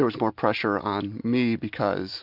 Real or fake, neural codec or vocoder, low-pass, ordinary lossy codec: real; none; 5.4 kHz; AAC, 48 kbps